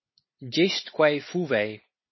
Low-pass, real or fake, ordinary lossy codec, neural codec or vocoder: 7.2 kHz; real; MP3, 24 kbps; none